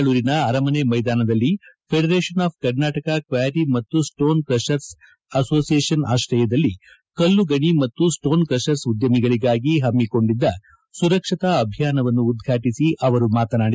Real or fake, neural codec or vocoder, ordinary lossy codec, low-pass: real; none; none; none